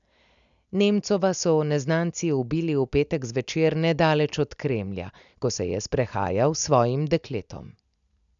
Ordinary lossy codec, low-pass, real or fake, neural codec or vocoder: MP3, 96 kbps; 7.2 kHz; real; none